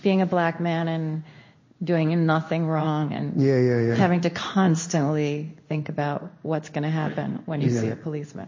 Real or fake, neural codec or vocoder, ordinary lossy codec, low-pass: fake; codec, 16 kHz in and 24 kHz out, 1 kbps, XY-Tokenizer; MP3, 32 kbps; 7.2 kHz